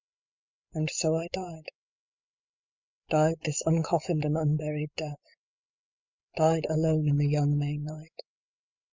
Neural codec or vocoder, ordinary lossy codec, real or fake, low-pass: codec, 16 kHz, 16 kbps, FreqCodec, larger model; MP3, 64 kbps; fake; 7.2 kHz